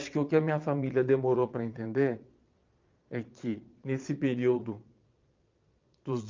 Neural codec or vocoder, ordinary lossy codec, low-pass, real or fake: vocoder, 22.05 kHz, 80 mel bands, Vocos; Opus, 24 kbps; 7.2 kHz; fake